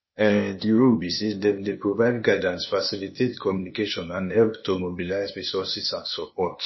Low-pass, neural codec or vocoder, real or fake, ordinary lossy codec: 7.2 kHz; codec, 16 kHz, 0.8 kbps, ZipCodec; fake; MP3, 24 kbps